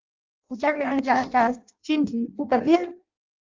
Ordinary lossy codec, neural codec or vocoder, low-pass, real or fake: Opus, 32 kbps; codec, 16 kHz in and 24 kHz out, 0.6 kbps, FireRedTTS-2 codec; 7.2 kHz; fake